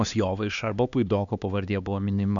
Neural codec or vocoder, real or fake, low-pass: codec, 16 kHz, 2 kbps, X-Codec, HuBERT features, trained on LibriSpeech; fake; 7.2 kHz